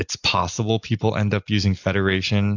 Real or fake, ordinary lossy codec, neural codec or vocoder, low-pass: real; AAC, 48 kbps; none; 7.2 kHz